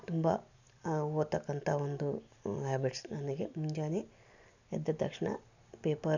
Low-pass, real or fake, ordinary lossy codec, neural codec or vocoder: 7.2 kHz; real; none; none